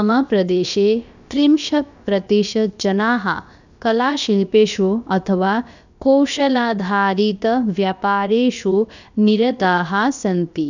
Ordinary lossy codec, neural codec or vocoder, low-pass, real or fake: none; codec, 16 kHz, about 1 kbps, DyCAST, with the encoder's durations; 7.2 kHz; fake